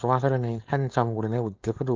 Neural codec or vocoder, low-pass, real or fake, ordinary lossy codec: codec, 16 kHz, 4.8 kbps, FACodec; 7.2 kHz; fake; Opus, 24 kbps